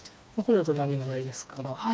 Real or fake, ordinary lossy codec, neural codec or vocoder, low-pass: fake; none; codec, 16 kHz, 2 kbps, FreqCodec, smaller model; none